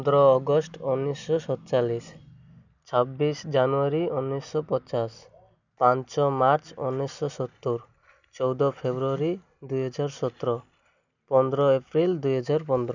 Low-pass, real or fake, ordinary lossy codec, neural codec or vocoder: 7.2 kHz; real; none; none